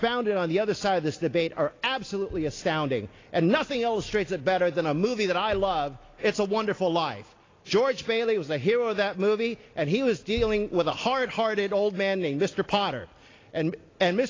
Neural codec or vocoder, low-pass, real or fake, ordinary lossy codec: none; 7.2 kHz; real; AAC, 32 kbps